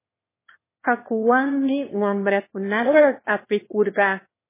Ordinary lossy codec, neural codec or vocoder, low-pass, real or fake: MP3, 16 kbps; autoencoder, 22.05 kHz, a latent of 192 numbers a frame, VITS, trained on one speaker; 3.6 kHz; fake